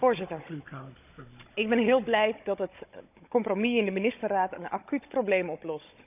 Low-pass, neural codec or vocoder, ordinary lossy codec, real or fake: 3.6 kHz; codec, 16 kHz, 16 kbps, FunCodec, trained on Chinese and English, 50 frames a second; none; fake